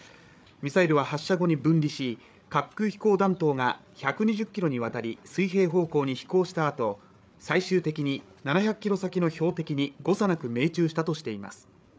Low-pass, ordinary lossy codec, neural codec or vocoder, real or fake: none; none; codec, 16 kHz, 8 kbps, FreqCodec, larger model; fake